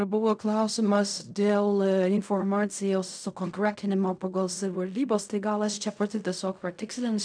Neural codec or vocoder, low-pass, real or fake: codec, 16 kHz in and 24 kHz out, 0.4 kbps, LongCat-Audio-Codec, fine tuned four codebook decoder; 9.9 kHz; fake